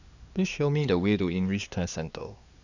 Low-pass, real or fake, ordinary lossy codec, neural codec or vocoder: 7.2 kHz; fake; none; codec, 16 kHz, 2 kbps, X-Codec, HuBERT features, trained on LibriSpeech